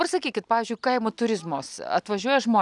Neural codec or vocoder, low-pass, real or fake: none; 10.8 kHz; real